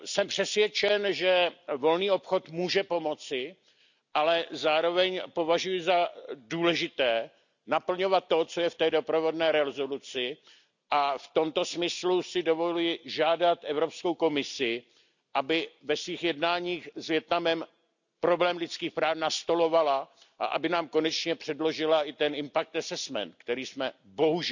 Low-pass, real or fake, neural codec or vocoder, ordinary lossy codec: 7.2 kHz; real; none; none